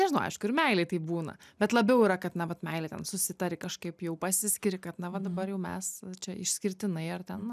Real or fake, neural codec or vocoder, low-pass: real; none; 14.4 kHz